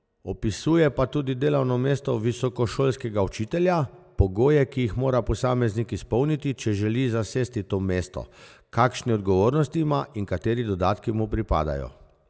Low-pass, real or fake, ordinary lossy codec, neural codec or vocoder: none; real; none; none